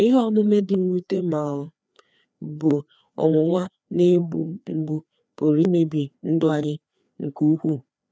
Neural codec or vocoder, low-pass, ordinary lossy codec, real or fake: codec, 16 kHz, 2 kbps, FreqCodec, larger model; none; none; fake